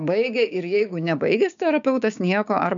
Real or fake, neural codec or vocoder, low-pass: fake; codec, 16 kHz, 6 kbps, DAC; 7.2 kHz